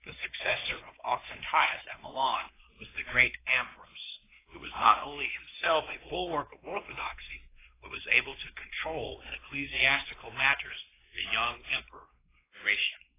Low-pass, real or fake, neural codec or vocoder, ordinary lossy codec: 3.6 kHz; fake; codec, 16 kHz, 2 kbps, X-Codec, WavLM features, trained on Multilingual LibriSpeech; AAC, 16 kbps